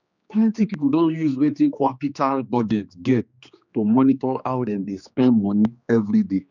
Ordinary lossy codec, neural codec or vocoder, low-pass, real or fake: none; codec, 16 kHz, 2 kbps, X-Codec, HuBERT features, trained on general audio; 7.2 kHz; fake